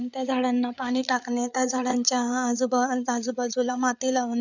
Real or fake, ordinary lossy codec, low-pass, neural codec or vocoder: fake; none; 7.2 kHz; codec, 16 kHz, 8 kbps, FreqCodec, larger model